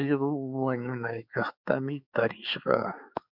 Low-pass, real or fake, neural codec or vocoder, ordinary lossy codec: 5.4 kHz; fake; codec, 16 kHz, 4 kbps, X-Codec, HuBERT features, trained on balanced general audio; Opus, 64 kbps